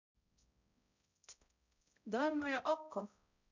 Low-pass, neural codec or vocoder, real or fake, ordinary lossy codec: 7.2 kHz; codec, 16 kHz, 0.5 kbps, X-Codec, HuBERT features, trained on balanced general audio; fake; none